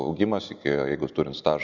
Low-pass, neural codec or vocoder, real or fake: 7.2 kHz; none; real